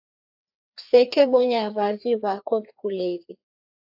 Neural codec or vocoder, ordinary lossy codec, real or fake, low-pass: codec, 16 kHz, 2 kbps, FreqCodec, larger model; AAC, 48 kbps; fake; 5.4 kHz